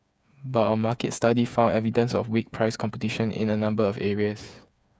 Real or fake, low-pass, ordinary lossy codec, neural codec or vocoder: fake; none; none; codec, 16 kHz, 8 kbps, FreqCodec, smaller model